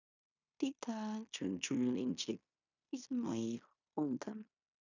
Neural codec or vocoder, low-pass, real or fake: codec, 16 kHz in and 24 kHz out, 0.9 kbps, LongCat-Audio-Codec, fine tuned four codebook decoder; 7.2 kHz; fake